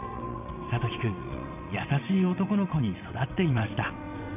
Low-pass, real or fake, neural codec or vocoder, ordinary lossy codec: 3.6 kHz; fake; vocoder, 22.05 kHz, 80 mel bands, WaveNeXt; none